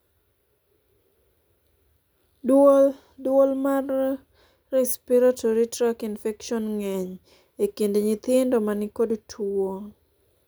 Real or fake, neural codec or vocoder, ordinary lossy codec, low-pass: real; none; none; none